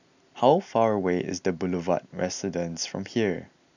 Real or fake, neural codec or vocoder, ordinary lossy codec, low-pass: real; none; none; 7.2 kHz